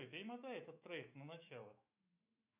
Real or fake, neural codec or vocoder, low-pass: fake; autoencoder, 48 kHz, 128 numbers a frame, DAC-VAE, trained on Japanese speech; 3.6 kHz